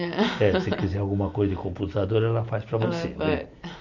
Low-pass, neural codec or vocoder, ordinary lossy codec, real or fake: 7.2 kHz; none; none; real